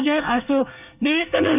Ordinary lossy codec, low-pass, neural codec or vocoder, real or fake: none; 3.6 kHz; codec, 24 kHz, 1 kbps, SNAC; fake